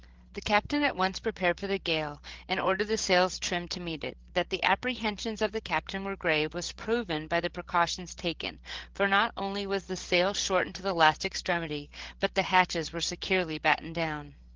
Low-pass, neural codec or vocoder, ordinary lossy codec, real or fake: 7.2 kHz; codec, 16 kHz, 16 kbps, FreqCodec, larger model; Opus, 16 kbps; fake